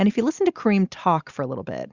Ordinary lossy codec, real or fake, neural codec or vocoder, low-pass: Opus, 64 kbps; real; none; 7.2 kHz